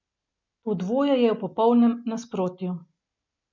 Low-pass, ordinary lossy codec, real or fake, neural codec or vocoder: 7.2 kHz; MP3, 64 kbps; real; none